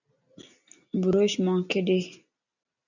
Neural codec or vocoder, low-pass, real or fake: none; 7.2 kHz; real